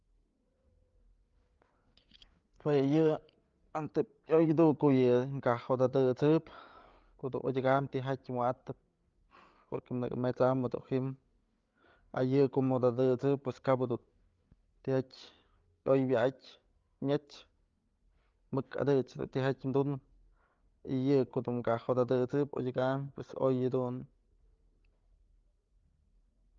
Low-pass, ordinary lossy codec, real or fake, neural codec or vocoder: 7.2 kHz; Opus, 32 kbps; fake; codec, 16 kHz, 8 kbps, FreqCodec, larger model